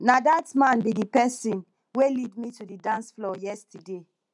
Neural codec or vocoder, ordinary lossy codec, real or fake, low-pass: none; none; real; 10.8 kHz